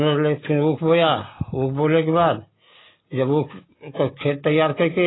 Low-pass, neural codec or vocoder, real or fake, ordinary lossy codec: 7.2 kHz; none; real; AAC, 16 kbps